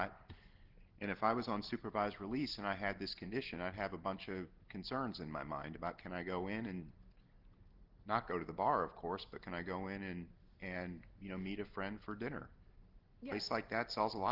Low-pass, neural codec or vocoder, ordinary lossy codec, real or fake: 5.4 kHz; none; Opus, 16 kbps; real